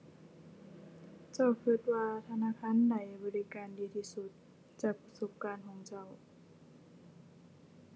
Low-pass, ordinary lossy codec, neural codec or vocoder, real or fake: none; none; none; real